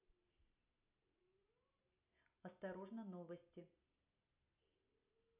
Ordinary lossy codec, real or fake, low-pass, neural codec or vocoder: none; real; 3.6 kHz; none